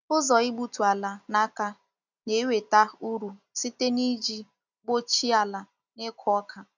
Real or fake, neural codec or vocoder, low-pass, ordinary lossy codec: real; none; 7.2 kHz; none